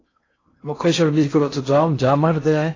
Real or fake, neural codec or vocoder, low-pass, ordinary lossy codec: fake; codec, 16 kHz in and 24 kHz out, 0.6 kbps, FocalCodec, streaming, 4096 codes; 7.2 kHz; AAC, 32 kbps